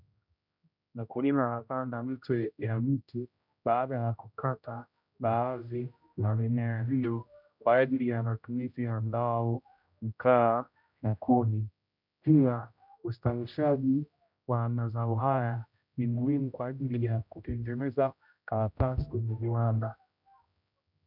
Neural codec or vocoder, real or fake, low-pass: codec, 16 kHz, 0.5 kbps, X-Codec, HuBERT features, trained on general audio; fake; 5.4 kHz